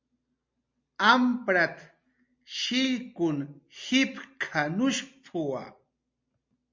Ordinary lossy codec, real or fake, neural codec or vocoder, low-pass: MP3, 48 kbps; real; none; 7.2 kHz